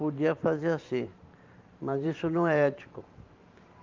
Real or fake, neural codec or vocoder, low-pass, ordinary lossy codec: fake; vocoder, 44.1 kHz, 128 mel bands every 512 samples, BigVGAN v2; 7.2 kHz; Opus, 24 kbps